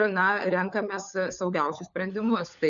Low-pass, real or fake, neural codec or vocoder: 7.2 kHz; fake; codec, 16 kHz, 4 kbps, FunCodec, trained on LibriTTS, 50 frames a second